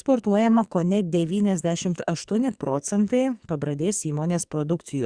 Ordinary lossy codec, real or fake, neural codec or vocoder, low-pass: Opus, 64 kbps; fake; codec, 44.1 kHz, 2.6 kbps, SNAC; 9.9 kHz